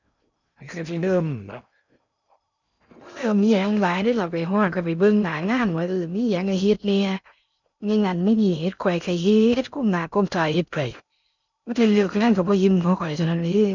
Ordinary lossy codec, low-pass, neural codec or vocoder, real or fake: none; 7.2 kHz; codec, 16 kHz in and 24 kHz out, 0.6 kbps, FocalCodec, streaming, 2048 codes; fake